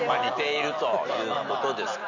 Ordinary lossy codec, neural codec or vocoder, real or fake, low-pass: Opus, 64 kbps; none; real; 7.2 kHz